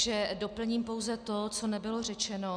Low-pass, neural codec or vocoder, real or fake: 9.9 kHz; none; real